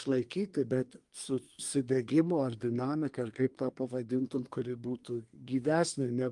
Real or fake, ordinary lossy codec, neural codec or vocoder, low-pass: fake; Opus, 32 kbps; codec, 24 kHz, 1 kbps, SNAC; 10.8 kHz